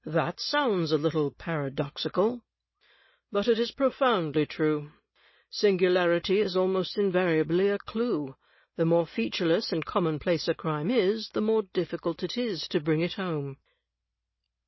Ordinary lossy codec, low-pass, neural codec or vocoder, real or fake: MP3, 24 kbps; 7.2 kHz; none; real